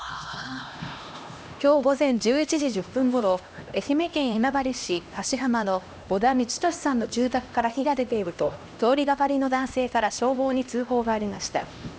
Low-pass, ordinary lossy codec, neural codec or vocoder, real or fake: none; none; codec, 16 kHz, 1 kbps, X-Codec, HuBERT features, trained on LibriSpeech; fake